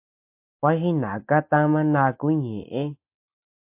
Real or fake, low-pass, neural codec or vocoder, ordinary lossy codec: real; 3.6 kHz; none; MP3, 32 kbps